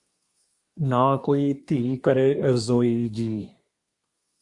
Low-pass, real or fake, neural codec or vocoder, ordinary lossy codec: 10.8 kHz; fake; codec, 24 kHz, 1 kbps, SNAC; Opus, 64 kbps